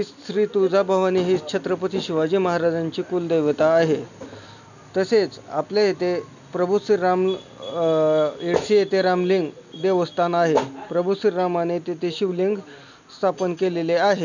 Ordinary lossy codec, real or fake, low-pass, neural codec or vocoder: none; real; 7.2 kHz; none